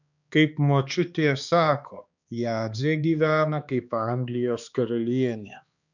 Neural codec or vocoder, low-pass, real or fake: codec, 16 kHz, 2 kbps, X-Codec, HuBERT features, trained on balanced general audio; 7.2 kHz; fake